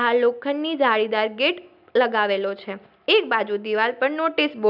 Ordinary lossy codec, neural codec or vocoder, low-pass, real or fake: none; none; 5.4 kHz; real